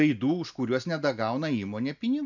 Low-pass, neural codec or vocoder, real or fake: 7.2 kHz; none; real